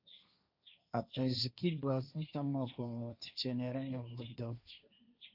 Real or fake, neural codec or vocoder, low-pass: fake; codec, 16 kHz, 1.1 kbps, Voila-Tokenizer; 5.4 kHz